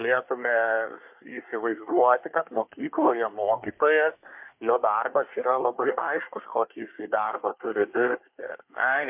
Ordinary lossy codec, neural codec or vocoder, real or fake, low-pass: MP3, 32 kbps; codec, 24 kHz, 1 kbps, SNAC; fake; 3.6 kHz